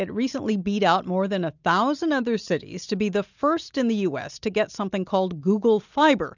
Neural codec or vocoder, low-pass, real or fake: none; 7.2 kHz; real